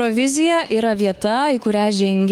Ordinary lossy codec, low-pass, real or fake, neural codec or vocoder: Opus, 32 kbps; 19.8 kHz; fake; autoencoder, 48 kHz, 32 numbers a frame, DAC-VAE, trained on Japanese speech